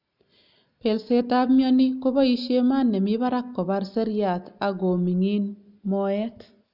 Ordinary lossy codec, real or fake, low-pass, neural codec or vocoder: none; real; 5.4 kHz; none